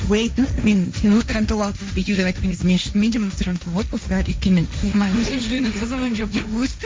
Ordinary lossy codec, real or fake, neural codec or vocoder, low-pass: none; fake; codec, 16 kHz, 1.1 kbps, Voila-Tokenizer; none